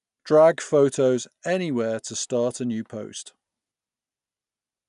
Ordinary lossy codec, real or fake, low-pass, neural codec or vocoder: none; real; 10.8 kHz; none